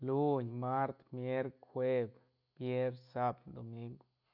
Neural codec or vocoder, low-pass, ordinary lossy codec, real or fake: none; 5.4 kHz; none; real